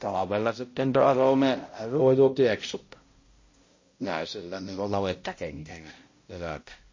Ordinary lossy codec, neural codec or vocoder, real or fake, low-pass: MP3, 32 kbps; codec, 16 kHz, 0.5 kbps, X-Codec, HuBERT features, trained on balanced general audio; fake; 7.2 kHz